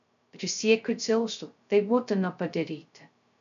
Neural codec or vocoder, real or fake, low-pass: codec, 16 kHz, 0.2 kbps, FocalCodec; fake; 7.2 kHz